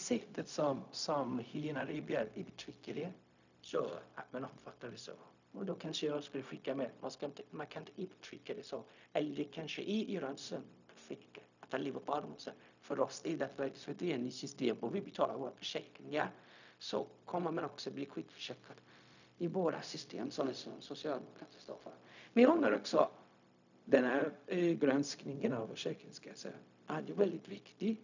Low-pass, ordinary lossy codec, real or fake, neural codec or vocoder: 7.2 kHz; none; fake; codec, 16 kHz, 0.4 kbps, LongCat-Audio-Codec